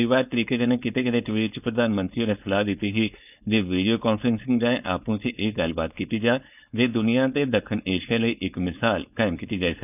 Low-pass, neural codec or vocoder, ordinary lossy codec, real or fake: 3.6 kHz; codec, 16 kHz, 4.8 kbps, FACodec; none; fake